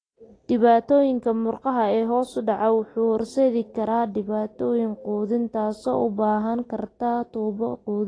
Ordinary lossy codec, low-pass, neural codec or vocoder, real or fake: AAC, 32 kbps; 9.9 kHz; none; real